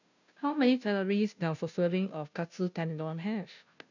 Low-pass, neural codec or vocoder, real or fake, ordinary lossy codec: 7.2 kHz; codec, 16 kHz, 0.5 kbps, FunCodec, trained on Chinese and English, 25 frames a second; fake; none